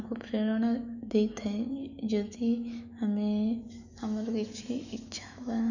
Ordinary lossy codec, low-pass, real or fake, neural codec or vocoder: none; 7.2 kHz; fake; autoencoder, 48 kHz, 128 numbers a frame, DAC-VAE, trained on Japanese speech